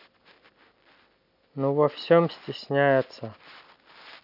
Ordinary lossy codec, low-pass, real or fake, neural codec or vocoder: none; 5.4 kHz; real; none